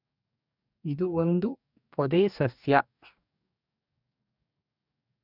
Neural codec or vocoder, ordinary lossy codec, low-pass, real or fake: codec, 44.1 kHz, 2.6 kbps, DAC; none; 5.4 kHz; fake